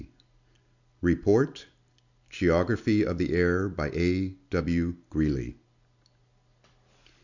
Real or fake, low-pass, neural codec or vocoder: real; 7.2 kHz; none